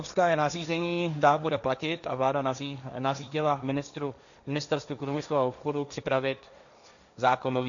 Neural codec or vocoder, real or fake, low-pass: codec, 16 kHz, 1.1 kbps, Voila-Tokenizer; fake; 7.2 kHz